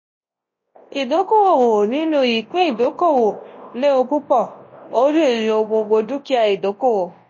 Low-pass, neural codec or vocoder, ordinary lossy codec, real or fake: 7.2 kHz; codec, 24 kHz, 0.9 kbps, WavTokenizer, large speech release; MP3, 32 kbps; fake